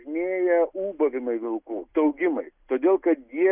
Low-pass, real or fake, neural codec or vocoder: 3.6 kHz; real; none